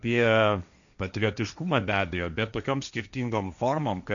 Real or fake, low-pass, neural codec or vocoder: fake; 7.2 kHz; codec, 16 kHz, 1.1 kbps, Voila-Tokenizer